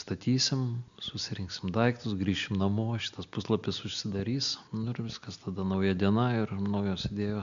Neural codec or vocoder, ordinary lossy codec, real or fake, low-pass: none; MP3, 64 kbps; real; 7.2 kHz